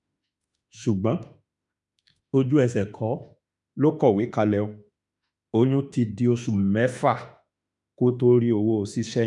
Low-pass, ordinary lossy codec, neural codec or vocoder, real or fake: 10.8 kHz; none; autoencoder, 48 kHz, 32 numbers a frame, DAC-VAE, trained on Japanese speech; fake